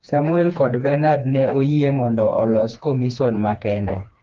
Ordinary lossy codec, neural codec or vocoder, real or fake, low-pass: Opus, 32 kbps; codec, 16 kHz, 2 kbps, FreqCodec, smaller model; fake; 7.2 kHz